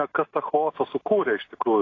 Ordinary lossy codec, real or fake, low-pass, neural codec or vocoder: AAC, 32 kbps; real; 7.2 kHz; none